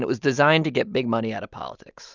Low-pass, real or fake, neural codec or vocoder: 7.2 kHz; real; none